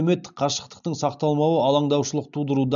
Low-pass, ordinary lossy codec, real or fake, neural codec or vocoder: 7.2 kHz; none; real; none